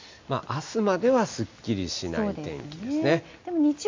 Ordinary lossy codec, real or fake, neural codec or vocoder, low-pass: MP3, 48 kbps; real; none; 7.2 kHz